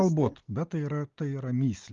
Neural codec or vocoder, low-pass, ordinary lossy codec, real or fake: none; 7.2 kHz; Opus, 32 kbps; real